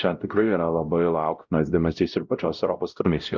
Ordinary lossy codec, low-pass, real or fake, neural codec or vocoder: Opus, 24 kbps; 7.2 kHz; fake; codec, 16 kHz, 0.5 kbps, X-Codec, WavLM features, trained on Multilingual LibriSpeech